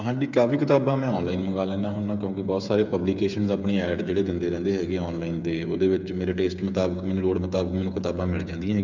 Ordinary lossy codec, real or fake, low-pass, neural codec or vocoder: none; fake; 7.2 kHz; codec, 16 kHz, 8 kbps, FreqCodec, smaller model